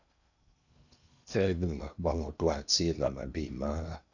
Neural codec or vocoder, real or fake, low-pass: codec, 16 kHz in and 24 kHz out, 0.8 kbps, FocalCodec, streaming, 65536 codes; fake; 7.2 kHz